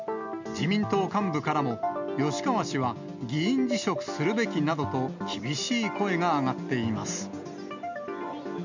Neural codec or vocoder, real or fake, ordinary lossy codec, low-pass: none; real; none; 7.2 kHz